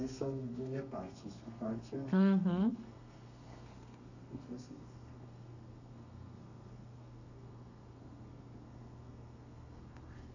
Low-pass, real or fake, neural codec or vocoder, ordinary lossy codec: 7.2 kHz; fake; codec, 32 kHz, 1.9 kbps, SNAC; none